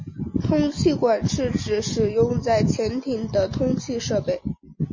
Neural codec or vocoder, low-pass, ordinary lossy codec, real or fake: none; 7.2 kHz; MP3, 32 kbps; real